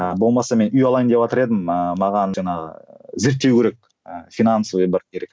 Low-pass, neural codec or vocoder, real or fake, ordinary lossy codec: none; none; real; none